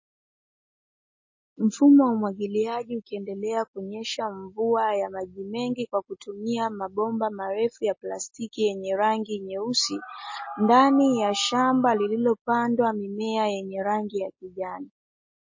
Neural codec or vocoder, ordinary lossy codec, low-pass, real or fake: none; MP3, 32 kbps; 7.2 kHz; real